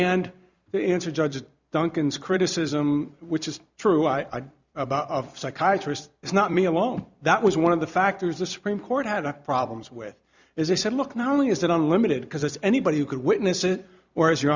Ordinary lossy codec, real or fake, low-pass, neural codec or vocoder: Opus, 64 kbps; fake; 7.2 kHz; vocoder, 44.1 kHz, 128 mel bands every 256 samples, BigVGAN v2